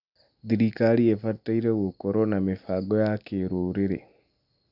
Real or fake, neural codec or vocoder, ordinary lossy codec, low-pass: real; none; none; 5.4 kHz